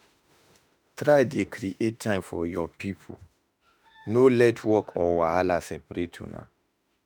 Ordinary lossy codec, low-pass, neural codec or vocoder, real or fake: none; none; autoencoder, 48 kHz, 32 numbers a frame, DAC-VAE, trained on Japanese speech; fake